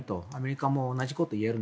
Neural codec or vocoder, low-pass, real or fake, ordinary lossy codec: none; none; real; none